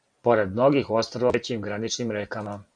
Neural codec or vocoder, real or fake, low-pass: vocoder, 44.1 kHz, 128 mel bands every 512 samples, BigVGAN v2; fake; 9.9 kHz